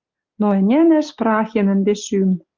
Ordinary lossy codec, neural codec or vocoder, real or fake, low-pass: Opus, 24 kbps; none; real; 7.2 kHz